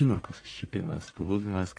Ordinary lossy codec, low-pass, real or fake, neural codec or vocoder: AAC, 48 kbps; 9.9 kHz; fake; codec, 44.1 kHz, 1.7 kbps, Pupu-Codec